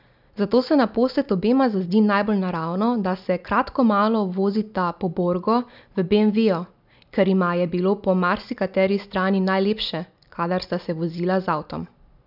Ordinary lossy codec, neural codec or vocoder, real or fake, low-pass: none; none; real; 5.4 kHz